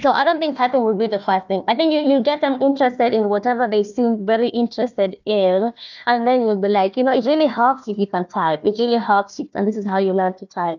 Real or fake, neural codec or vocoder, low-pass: fake; codec, 16 kHz, 1 kbps, FunCodec, trained on Chinese and English, 50 frames a second; 7.2 kHz